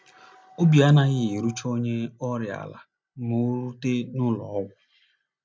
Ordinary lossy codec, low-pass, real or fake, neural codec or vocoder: none; none; real; none